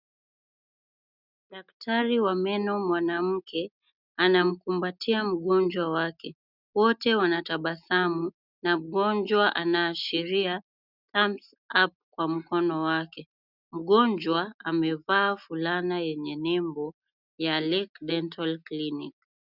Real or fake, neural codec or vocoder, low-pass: real; none; 5.4 kHz